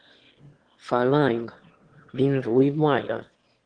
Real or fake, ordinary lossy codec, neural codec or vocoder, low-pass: fake; Opus, 16 kbps; autoencoder, 22.05 kHz, a latent of 192 numbers a frame, VITS, trained on one speaker; 9.9 kHz